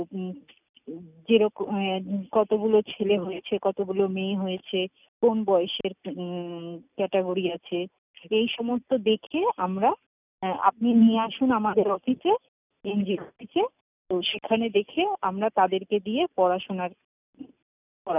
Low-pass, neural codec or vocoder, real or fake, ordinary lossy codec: 3.6 kHz; none; real; none